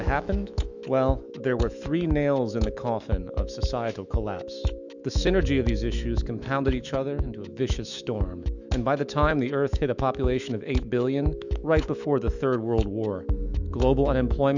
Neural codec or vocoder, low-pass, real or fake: autoencoder, 48 kHz, 128 numbers a frame, DAC-VAE, trained on Japanese speech; 7.2 kHz; fake